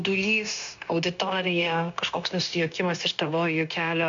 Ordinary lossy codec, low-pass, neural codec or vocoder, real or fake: MP3, 64 kbps; 7.2 kHz; codec, 16 kHz, 0.9 kbps, LongCat-Audio-Codec; fake